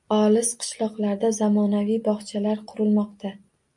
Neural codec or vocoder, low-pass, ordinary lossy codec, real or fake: none; 10.8 kHz; MP3, 64 kbps; real